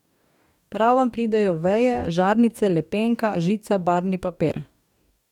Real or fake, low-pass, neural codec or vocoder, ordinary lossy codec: fake; 19.8 kHz; codec, 44.1 kHz, 2.6 kbps, DAC; none